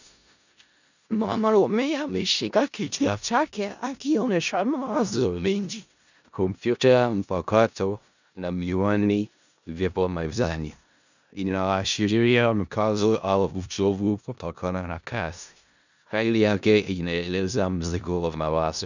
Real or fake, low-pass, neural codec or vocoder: fake; 7.2 kHz; codec, 16 kHz in and 24 kHz out, 0.4 kbps, LongCat-Audio-Codec, four codebook decoder